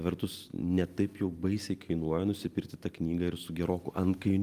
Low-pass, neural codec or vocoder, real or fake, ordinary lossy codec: 14.4 kHz; none; real; Opus, 32 kbps